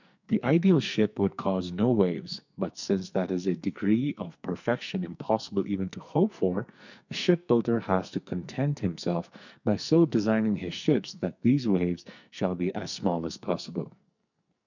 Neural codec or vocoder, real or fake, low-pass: codec, 44.1 kHz, 2.6 kbps, SNAC; fake; 7.2 kHz